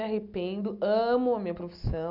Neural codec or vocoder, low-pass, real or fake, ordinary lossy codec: none; 5.4 kHz; real; none